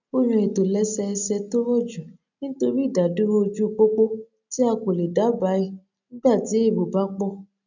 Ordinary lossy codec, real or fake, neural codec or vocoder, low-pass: none; real; none; 7.2 kHz